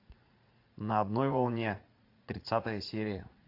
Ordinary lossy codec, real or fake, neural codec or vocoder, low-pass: AAC, 32 kbps; fake; vocoder, 44.1 kHz, 80 mel bands, Vocos; 5.4 kHz